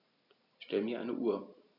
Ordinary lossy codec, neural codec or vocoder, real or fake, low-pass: none; none; real; 5.4 kHz